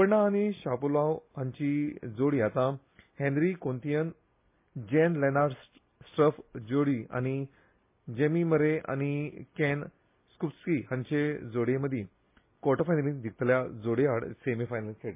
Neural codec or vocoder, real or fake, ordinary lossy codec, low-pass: none; real; MP3, 24 kbps; 3.6 kHz